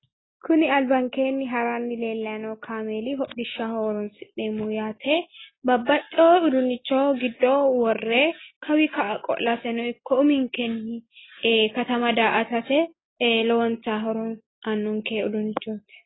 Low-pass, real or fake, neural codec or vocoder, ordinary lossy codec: 7.2 kHz; real; none; AAC, 16 kbps